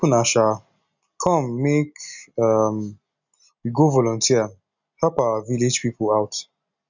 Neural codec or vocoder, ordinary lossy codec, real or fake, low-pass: none; none; real; 7.2 kHz